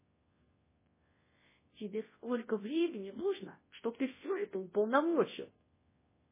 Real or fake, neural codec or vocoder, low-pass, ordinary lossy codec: fake; codec, 24 kHz, 0.9 kbps, WavTokenizer, large speech release; 3.6 kHz; MP3, 16 kbps